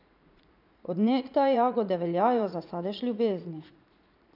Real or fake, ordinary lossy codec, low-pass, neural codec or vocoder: fake; none; 5.4 kHz; vocoder, 44.1 kHz, 80 mel bands, Vocos